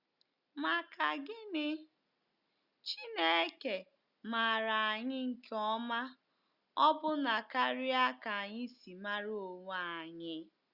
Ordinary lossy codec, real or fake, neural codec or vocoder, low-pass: Opus, 64 kbps; real; none; 5.4 kHz